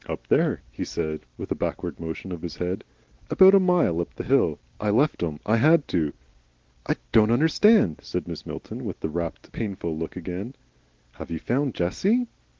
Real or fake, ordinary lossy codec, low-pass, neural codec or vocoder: real; Opus, 16 kbps; 7.2 kHz; none